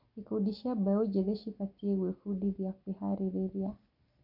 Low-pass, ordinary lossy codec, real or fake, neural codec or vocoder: 5.4 kHz; none; real; none